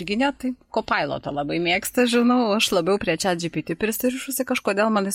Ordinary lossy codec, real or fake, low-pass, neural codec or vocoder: MP3, 64 kbps; real; 19.8 kHz; none